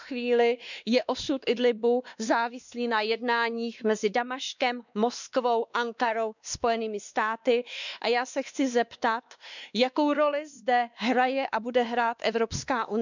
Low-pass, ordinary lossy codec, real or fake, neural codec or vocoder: 7.2 kHz; none; fake; codec, 16 kHz, 2 kbps, X-Codec, WavLM features, trained on Multilingual LibriSpeech